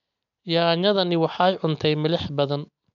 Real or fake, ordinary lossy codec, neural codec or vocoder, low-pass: real; none; none; 7.2 kHz